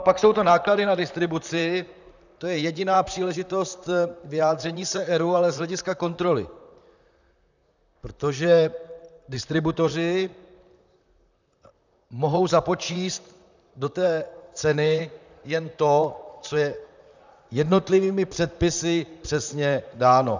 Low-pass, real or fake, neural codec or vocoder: 7.2 kHz; fake; vocoder, 44.1 kHz, 128 mel bands, Pupu-Vocoder